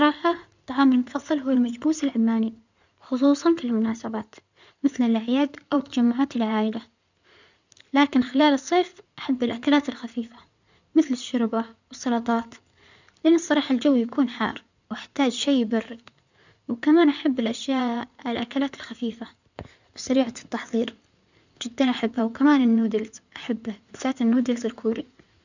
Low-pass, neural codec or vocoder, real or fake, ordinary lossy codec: 7.2 kHz; codec, 16 kHz in and 24 kHz out, 2.2 kbps, FireRedTTS-2 codec; fake; none